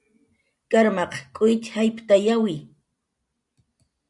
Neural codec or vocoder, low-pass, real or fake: none; 10.8 kHz; real